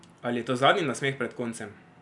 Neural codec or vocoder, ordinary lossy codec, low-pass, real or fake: none; none; 10.8 kHz; real